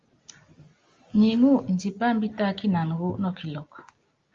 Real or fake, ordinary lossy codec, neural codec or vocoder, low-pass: real; Opus, 32 kbps; none; 7.2 kHz